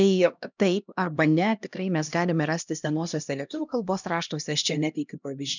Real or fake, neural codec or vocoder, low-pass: fake; codec, 16 kHz, 1 kbps, X-Codec, HuBERT features, trained on LibriSpeech; 7.2 kHz